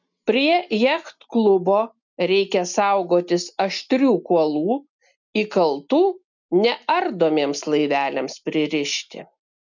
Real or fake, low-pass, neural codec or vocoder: real; 7.2 kHz; none